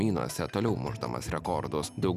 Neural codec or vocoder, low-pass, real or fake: none; 14.4 kHz; real